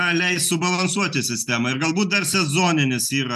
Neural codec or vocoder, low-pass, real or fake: none; 14.4 kHz; real